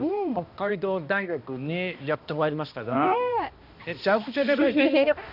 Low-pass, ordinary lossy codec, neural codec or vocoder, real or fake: 5.4 kHz; none; codec, 16 kHz, 1 kbps, X-Codec, HuBERT features, trained on balanced general audio; fake